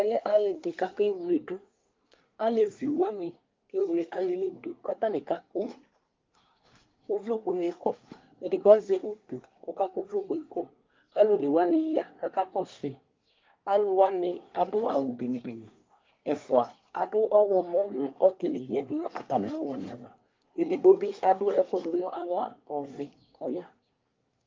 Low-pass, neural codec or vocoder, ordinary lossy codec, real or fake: 7.2 kHz; codec, 24 kHz, 1 kbps, SNAC; Opus, 24 kbps; fake